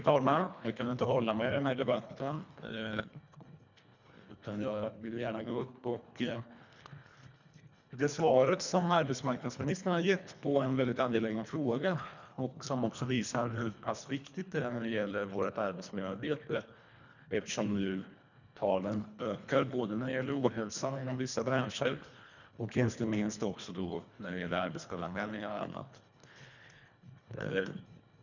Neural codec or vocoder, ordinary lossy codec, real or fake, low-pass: codec, 24 kHz, 1.5 kbps, HILCodec; none; fake; 7.2 kHz